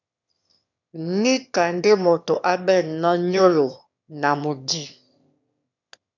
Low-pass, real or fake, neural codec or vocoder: 7.2 kHz; fake; autoencoder, 22.05 kHz, a latent of 192 numbers a frame, VITS, trained on one speaker